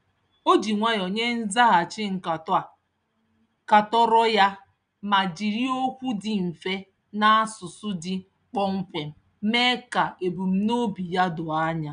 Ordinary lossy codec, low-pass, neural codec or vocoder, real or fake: none; 9.9 kHz; none; real